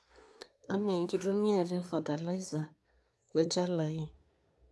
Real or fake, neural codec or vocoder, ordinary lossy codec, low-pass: fake; codec, 24 kHz, 1 kbps, SNAC; none; none